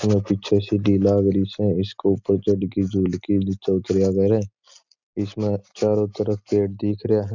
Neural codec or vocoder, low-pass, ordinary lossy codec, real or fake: none; 7.2 kHz; none; real